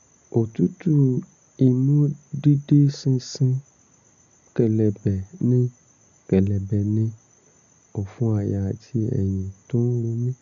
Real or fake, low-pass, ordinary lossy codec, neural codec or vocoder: real; 7.2 kHz; none; none